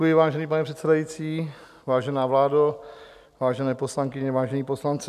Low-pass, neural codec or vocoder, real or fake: 14.4 kHz; none; real